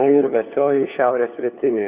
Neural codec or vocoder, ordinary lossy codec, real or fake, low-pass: codec, 16 kHz, 4 kbps, FunCodec, trained on LibriTTS, 50 frames a second; Opus, 64 kbps; fake; 3.6 kHz